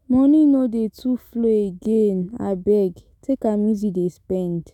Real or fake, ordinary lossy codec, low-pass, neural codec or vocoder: fake; none; 19.8 kHz; autoencoder, 48 kHz, 128 numbers a frame, DAC-VAE, trained on Japanese speech